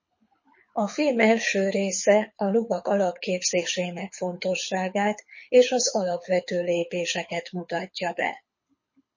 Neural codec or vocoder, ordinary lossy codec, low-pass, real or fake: codec, 24 kHz, 6 kbps, HILCodec; MP3, 32 kbps; 7.2 kHz; fake